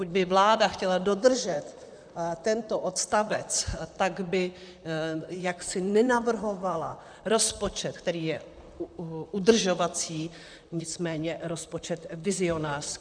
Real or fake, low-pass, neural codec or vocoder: fake; 9.9 kHz; vocoder, 44.1 kHz, 128 mel bands, Pupu-Vocoder